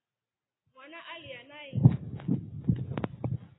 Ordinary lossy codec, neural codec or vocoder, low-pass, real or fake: AAC, 16 kbps; none; 7.2 kHz; real